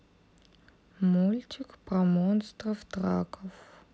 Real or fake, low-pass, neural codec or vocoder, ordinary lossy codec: real; none; none; none